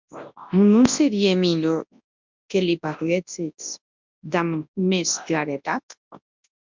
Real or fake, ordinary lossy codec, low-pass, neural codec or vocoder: fake; MP3, 64 kbps; 7.2 kHz; codec, 24 kHz, 0.9 kbps, WavTokenizer, large speech release